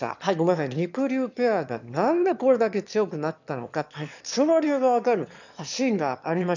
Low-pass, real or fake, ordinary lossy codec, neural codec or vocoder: 7.2 kHz; fake; none; autoencoder, 22.05 kHz, a latent of 192 numbers a frame, VITS, trained on one speaker